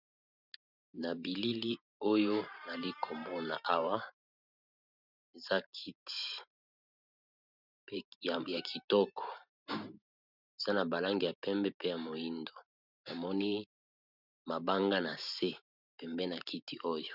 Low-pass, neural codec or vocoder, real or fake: 5.4 kHz; vocoder, 44.1 kHz, 128 mel bands every 512 samples, BigVGAN v2; fake